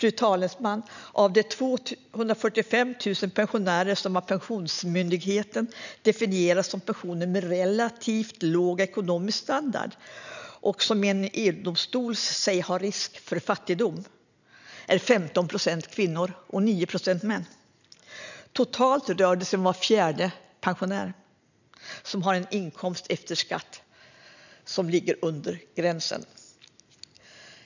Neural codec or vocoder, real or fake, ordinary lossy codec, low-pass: none; real; none; 7.2 kHz